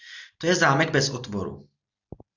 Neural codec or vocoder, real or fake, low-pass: none; real; 7.2 kHz